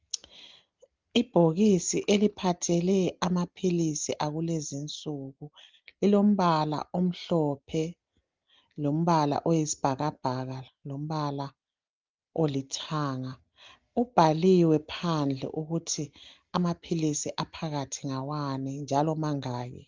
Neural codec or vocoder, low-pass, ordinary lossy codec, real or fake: none; 7.2 kHz; Opus, 32 kbps; real